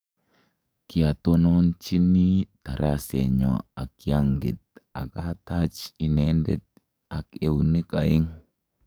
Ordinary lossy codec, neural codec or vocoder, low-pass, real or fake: none; codec, 44.1 kHz, 7.8 kbps, DAC; none; fake